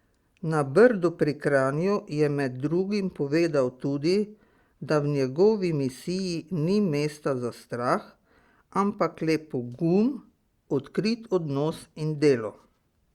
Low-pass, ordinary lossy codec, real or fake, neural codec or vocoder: 19.8 kHz; Opus, 64 kbps; real; none